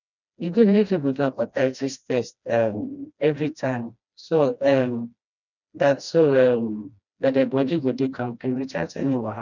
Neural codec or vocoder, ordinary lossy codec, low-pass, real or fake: codec, 16 kHz, 1 kbps, FreqCodec, smaller model; none; 7.2 kHz; fake